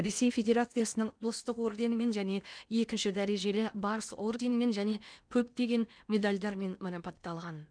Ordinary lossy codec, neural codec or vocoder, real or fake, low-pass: none; codec, 16 kHz in and 24 kHz out, 0.8 kbps, FocalCodec, streaming, 65536 codes; fake; 9.9 kHz